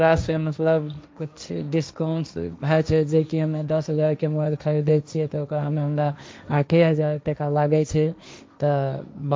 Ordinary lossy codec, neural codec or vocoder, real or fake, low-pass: none; codec, 16 kHz, 1.1 kbps, Voila-Tokenizer; fake; none